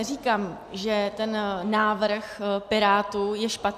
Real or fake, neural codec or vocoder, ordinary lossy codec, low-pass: real; none; AAC, 96 kbps; 14.4 kHz